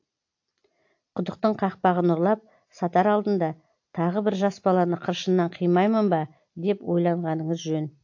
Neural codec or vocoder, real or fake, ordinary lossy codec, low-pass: none; real; AAC, 48 kbps; 7.2 kHz